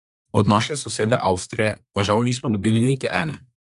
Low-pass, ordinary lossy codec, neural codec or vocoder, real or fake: 10.8 kHz; none; codec, 24 kHz, 1 kbps, SNAC; fake